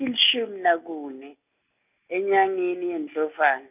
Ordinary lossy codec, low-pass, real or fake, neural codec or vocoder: none; 3.6 kHz; real; none